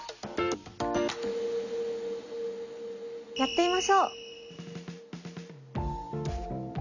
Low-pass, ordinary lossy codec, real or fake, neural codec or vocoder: 7.2 kHz; none; real; none